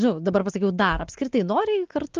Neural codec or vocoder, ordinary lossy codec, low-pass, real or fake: none; Opus, 16 kbps; 7.2 kHz; real